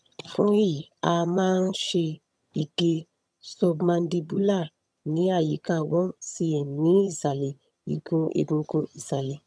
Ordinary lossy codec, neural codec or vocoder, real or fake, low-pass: none; vocoder, 22.05 kHz, 80 mel bands, HiFi-GAN; fake; none